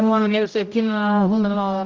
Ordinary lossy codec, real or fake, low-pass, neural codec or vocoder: Opus, 24 kbps; fake; 7.2 kHz; codec, 16 kHz, 0.5 kbps, X-Codec, HuBERT features, trained on general audio